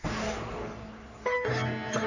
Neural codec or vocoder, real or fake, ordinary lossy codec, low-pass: codec, 44.1 kHz, 3.4 kbps, Pupu-Codec; fake; none; 7.2 kHz